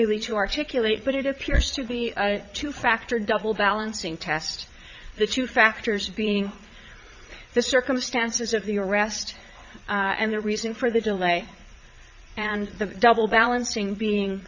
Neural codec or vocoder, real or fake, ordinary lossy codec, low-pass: vocoder, 22.05 kHz, 80 mel bands, Vocos; fake; Opus, 64 kbps; 7.2 kHz